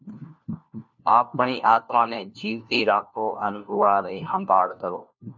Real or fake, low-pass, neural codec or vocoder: fake; 7.2 kHz; codec, 16 kHz, 1 kbps, FunCodec, trained on LibriTTS, 50 frames a second